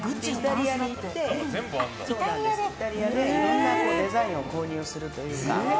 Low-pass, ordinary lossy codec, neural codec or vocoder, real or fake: none; none; none; real